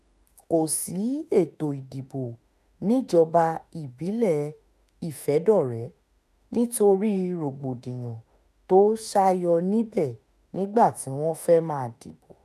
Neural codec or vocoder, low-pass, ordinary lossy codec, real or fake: autoencoder, 48 kHz, 32 numbers a frame, DAC-VAE, trained on Japanese speech; 14.4 kHz; none; fake